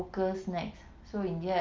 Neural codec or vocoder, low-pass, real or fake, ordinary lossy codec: none; 7.2 kHz; real; Opus, 32 kbps